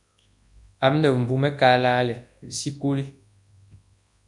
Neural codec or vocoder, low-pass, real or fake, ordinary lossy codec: codec, 24 kHz, 0.9 kbps, WavTokenizer, large speech release; 10.8 kHz; fake; MP3, 96 kbps